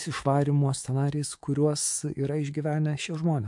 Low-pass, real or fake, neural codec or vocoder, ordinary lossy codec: 10.8 kHz; fake; autoencoder, 48 kHz, 128 numbers a frame, DAC-VAE, trained on Japanese speech; MP3, 64 kbps